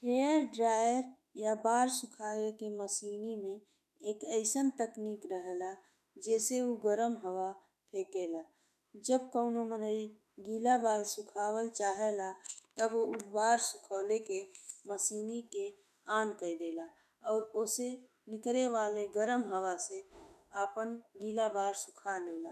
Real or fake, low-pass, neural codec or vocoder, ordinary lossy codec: fake; 14.4 kHz; autoencoder, 48 kHz, 32 numbers a frame, DAC-VAE, trained on Japanese speech; none